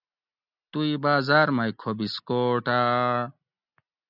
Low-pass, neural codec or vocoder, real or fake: 5.4 kHz; none; real